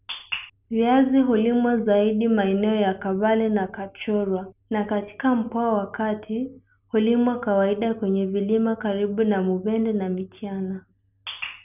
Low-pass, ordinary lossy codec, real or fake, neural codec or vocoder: 3.6 kHz; none; real; none